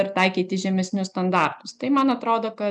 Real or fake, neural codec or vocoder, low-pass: real; none; 10.8 kHz